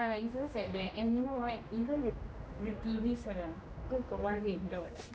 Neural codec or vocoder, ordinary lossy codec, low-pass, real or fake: codec, 16 kHz, 1 kbps, X-Codec, HuBERT features, trained on general audio; none; none; fake